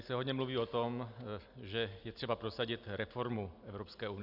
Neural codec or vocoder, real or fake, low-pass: none; real; 5.4 kHz